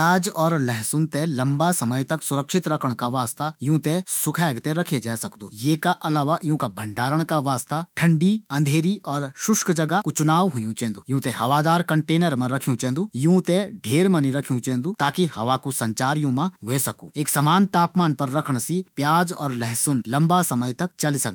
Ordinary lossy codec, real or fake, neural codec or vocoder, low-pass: none; fake; autoencoder, 48 kHz, 32 numbers a frame, DAC-VAE, trained on Japanese speech; none